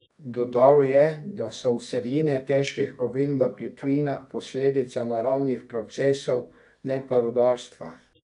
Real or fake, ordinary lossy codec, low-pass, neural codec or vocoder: fake; none; 10.8 kHz; codec, 24 kHz, 0.9 kbps, WavTokenizer, medium music audio release